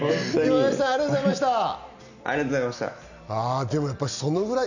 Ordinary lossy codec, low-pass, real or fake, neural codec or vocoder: none; 7.2 kHz; real; none